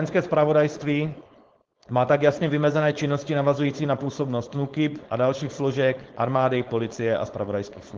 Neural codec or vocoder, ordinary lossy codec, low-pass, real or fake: codec, 16 kHz, 4.8 kbps, FACodec; Opus, 16 kbps; 7.2 kHz; fake